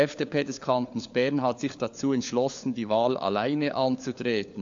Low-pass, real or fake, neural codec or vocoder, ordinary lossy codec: 7.2 kHz; fake; codec, 16 kHz, 4 kbps, FunCodec, trained on LibriTTS, 50 frames a second; AAC, 64 kbps